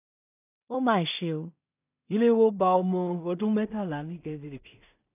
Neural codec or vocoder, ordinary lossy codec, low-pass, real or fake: codec, 16 kHz in and 24 kHz out, 0.4 kbps, LongCat-Audio-Codec, two codebook decoder; none; 3.6 kHz; fake